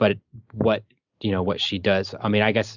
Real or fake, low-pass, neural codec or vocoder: real; 7.2 kHz; none